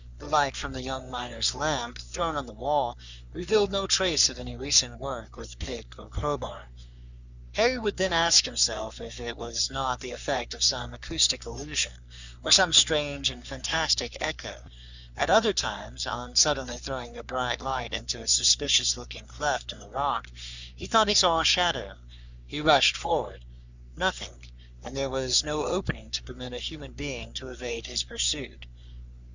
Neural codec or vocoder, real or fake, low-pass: codec, 44.1 kHz, 3.4 kbps, Pupu-Codec; fake; 7.2 kHz